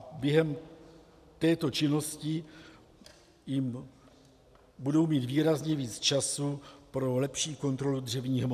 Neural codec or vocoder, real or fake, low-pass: none; real; 14.4 kHz